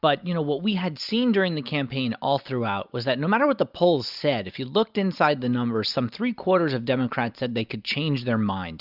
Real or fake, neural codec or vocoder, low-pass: real; none; 5.4 kHz